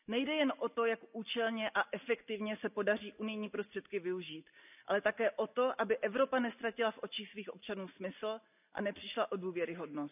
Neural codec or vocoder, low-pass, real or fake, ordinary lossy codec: none; 3.6 kHz; real; none